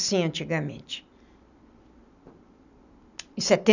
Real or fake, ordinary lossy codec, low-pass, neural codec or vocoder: real; none; 7.2 kHz; none